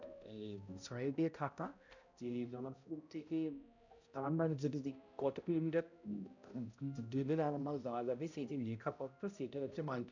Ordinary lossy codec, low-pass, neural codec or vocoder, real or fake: none; 7.2 kHz; codec, 16 kHz, 0.5 kbps, X-Codec, HuBERT features, trained on balanced general audio; fake